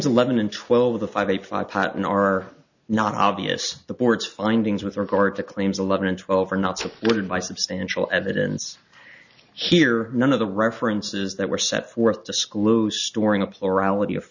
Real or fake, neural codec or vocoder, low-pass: real; none; 7.2 kHz